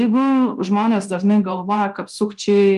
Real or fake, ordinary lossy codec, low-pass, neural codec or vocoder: fake; Opus, 32 kbps; 10.8 kHz; codec, 24 kHz, 0.9 kbps, DualCodec